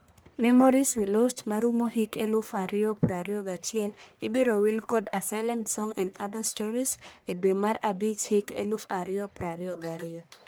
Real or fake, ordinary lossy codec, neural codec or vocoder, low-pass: fake; none; codec, 44.1 kHz, 1.7 kbps, Pupu-Codec; none